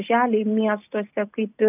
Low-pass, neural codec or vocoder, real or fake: 3.6 kHz; none; real